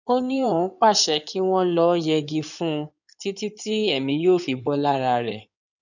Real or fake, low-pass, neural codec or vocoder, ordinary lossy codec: fake; 7.2 kHz; codec, 16 kHz in and 24 kHz out, 2.2 kbps, FireRedTTS-2 codec; none